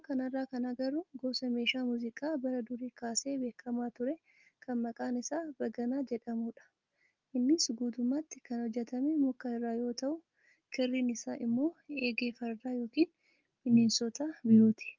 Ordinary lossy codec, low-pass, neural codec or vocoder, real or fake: Opus, 32 kbps; 7.2 kHz; none; real